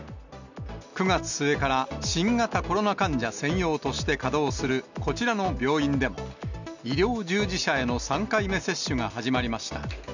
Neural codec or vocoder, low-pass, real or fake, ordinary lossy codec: none; 7.2 kHz; real; none